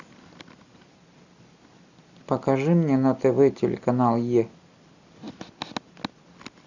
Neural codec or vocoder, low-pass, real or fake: none; 7.2 kHz; real